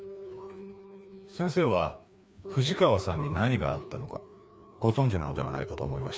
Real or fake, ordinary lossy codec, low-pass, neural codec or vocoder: fake; none; none; codec, 16 kHz, 2 kbps, FreqCodec, larger model